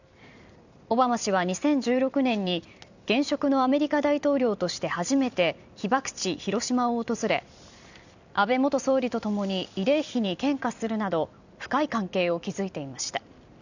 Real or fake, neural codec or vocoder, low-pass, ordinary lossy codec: fake; vocoder, 44.1 kHz, 128 mel bands every 256 samples, BigVGAN v2; 7.2 kHz; none